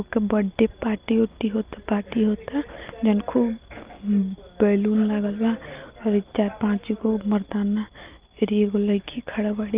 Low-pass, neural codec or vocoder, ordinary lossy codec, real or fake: 3.6 kHz; none; Opus, 24 kbps; real